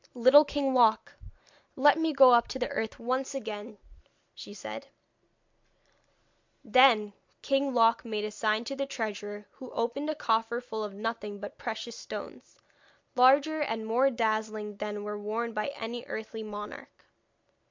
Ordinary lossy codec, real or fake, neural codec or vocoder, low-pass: MP3, 64 kbps; real; none; 7.2 kHz